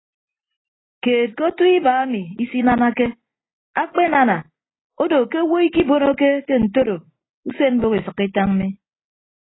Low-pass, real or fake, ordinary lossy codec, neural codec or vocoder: 7.2 kHz; real; AAC, 16 kbps; none